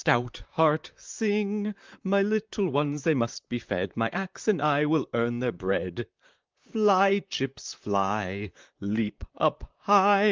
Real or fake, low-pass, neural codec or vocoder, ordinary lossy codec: fake; 7.2 kHz; vocoder, 44.1 kHz, 128 mel bands, Pupu-Vocoder; Opus, 24 kbps